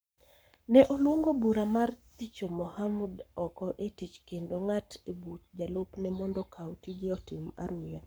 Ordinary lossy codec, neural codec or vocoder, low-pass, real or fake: none; codec, 44.1 kHz, 7.8 kbps, Pupu-Codec; none; fake